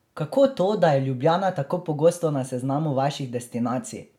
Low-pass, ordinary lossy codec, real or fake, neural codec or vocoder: 19.8 kHz; MP3, 96 kbps; real; none